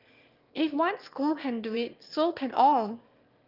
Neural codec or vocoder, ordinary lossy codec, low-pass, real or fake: autoencoder, 22.05 kHz, a latent of 192 numbers a frame, VITS, trained on one speaker; Opus, 24 kbps; 5.4 kHz; fake